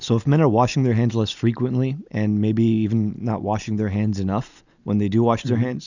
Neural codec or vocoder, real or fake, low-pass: none; real; 7.2 kHz